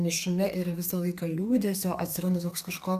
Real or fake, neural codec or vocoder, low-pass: fake; codec, 32 kHz, 1.9 kbps, SNAC; 14.4 kHz